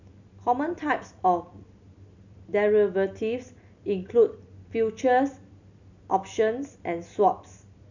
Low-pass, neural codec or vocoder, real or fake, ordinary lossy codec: 7.2 kHz; none; real; none